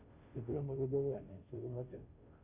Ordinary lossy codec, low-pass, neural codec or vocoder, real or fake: Opus, 64 kbps; 3.6 kHz; codec, 16 kHz, 0.5 kbps, FunCodec, trained on Chinese and English, 25 frames a second; fake